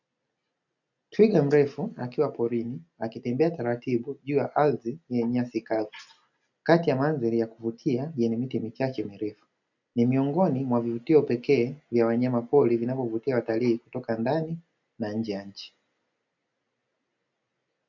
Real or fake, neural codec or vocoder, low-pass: real; none; 7.2 kHz